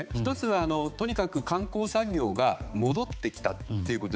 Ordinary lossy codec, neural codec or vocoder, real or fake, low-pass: none; codec, 16 kHz, 4 kbps, X-Codec, HuBERT features, trained on balanced general audio; fake; none